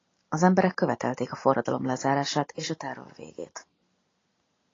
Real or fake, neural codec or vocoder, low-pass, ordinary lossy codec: real; none; 7.2 kHz; AAC, 32 kbps